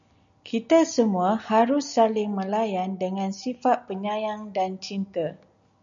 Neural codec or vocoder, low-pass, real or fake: none; 7.2 kHz; real